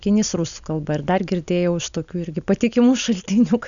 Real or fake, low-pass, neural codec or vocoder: real; 7.2 kHz; none